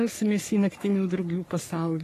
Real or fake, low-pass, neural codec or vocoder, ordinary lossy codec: fake; 14.4 kHz; codec, 44.1 kHz, 3.4 kbps, Pupu-Codec; AAC, 48 kbps